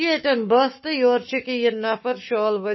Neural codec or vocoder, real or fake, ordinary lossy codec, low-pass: codec, 44.1 kHz, 7.8 kbps, Pupu-Codec; fake; MP3, 24 kbps; 7.2 kHz